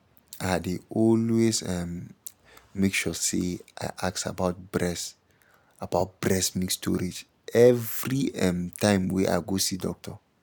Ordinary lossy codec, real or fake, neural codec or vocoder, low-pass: none; real; none; none